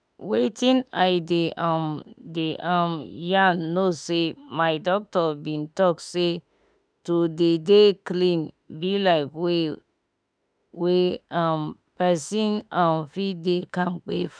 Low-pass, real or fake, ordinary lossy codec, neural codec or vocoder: 9.9 kHz; fake; none; autoencoder, 48 kHz, 32 numbers a frame, DAC-VAE, trained on Japanese speech